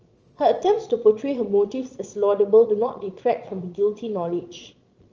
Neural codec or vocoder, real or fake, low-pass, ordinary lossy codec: vocoder, 22.05 kHz, 80 mel bands, Vocos; fake; 7.2 kHz; Opus, 24 kbps